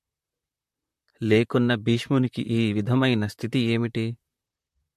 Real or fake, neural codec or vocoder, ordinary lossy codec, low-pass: fake; vocoder, 44.1 kHz, 128 mel bands, Pupu-Vocoder; MP3, 64 kbps; 14.4 kHz